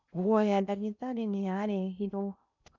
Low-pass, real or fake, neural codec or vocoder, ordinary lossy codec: 7.2 kHz; fake; codec, 16 kHz in and 24 kHz out, 0.8 kbps, FocalCodec, streaming, 65536 codes; none